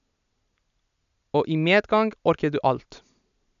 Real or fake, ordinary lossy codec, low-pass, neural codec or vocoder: real; none; 7.2 kHz; none